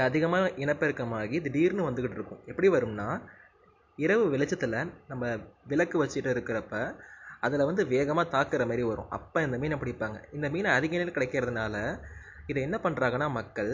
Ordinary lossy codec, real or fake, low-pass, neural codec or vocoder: MP3, 48 kbps; real; 7.2 kHz; none